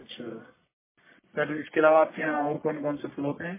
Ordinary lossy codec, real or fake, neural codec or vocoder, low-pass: MP3, 16 kbps; fake; codec, 44.1 kHz, 1.7 kbps, Pupu-Codec; 3.6 kHz